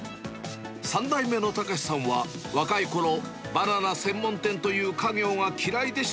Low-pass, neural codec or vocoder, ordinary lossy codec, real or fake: none; none; none; real